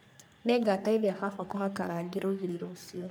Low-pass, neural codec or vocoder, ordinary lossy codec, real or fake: none; codec, 44.1 kHz, 3.4 kbps, Pupu-Codec; none; fake